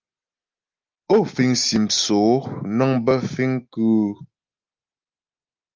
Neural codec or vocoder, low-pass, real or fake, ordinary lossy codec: none; 7.2 kHz; real; Opus, 24 kbps